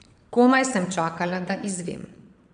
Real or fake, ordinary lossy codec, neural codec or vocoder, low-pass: fake; none; vocoder, 22.05 kHz, 80 mel bands, Vocos; 9.9 kHz